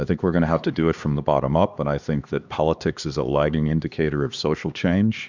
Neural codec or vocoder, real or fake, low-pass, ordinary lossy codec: codec, 16 kHz, 2 kbps, X-Codec, HuBERT features, trained on LibriSpeech; fake; 7.2 kHz; Opus, 64 kbps